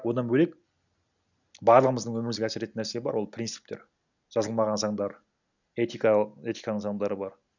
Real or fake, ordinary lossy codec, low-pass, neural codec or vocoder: real; none; 7.2 kHz; none